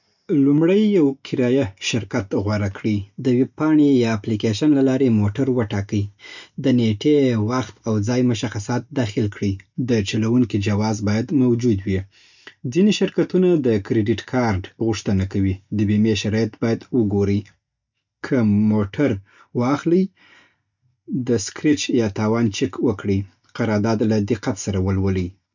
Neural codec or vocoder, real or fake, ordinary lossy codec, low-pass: none; real; none; 7.2 kHz